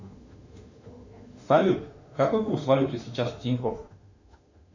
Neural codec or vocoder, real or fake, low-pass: autoencoder, 48 kHz, 32 numbers a frame, DAC-VAE, trained on Japanese speech; fake; 7.2 kHz